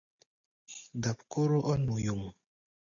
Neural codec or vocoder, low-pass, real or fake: none; 7.2 kHz; real